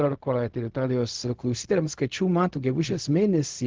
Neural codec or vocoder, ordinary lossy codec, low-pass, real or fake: codec, 16 kHz, 0.4 kbps, LongCat-Audio-Codec; Opus, 16 kbps; 7.2 kHz; fake